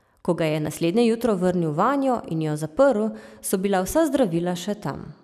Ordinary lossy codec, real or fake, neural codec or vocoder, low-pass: none; real; none; 14.4 kHz